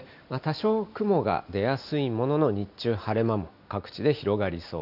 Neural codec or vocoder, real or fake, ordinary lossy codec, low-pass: none; real; none; 5.4 kHz